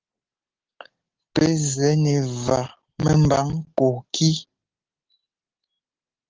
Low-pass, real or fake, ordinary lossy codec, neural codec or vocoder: 7.2 kHz; real; Opus, 16 kbps; none